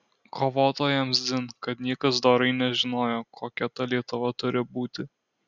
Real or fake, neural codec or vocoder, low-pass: real; none; 7.2 kHz